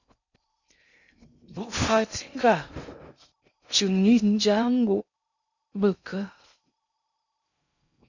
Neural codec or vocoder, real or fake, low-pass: codec, 16 kHz in and 24 kHz out, 0.6 kbps, FocalCodec, streaming, 2048 codes; fake; 7.2 kHz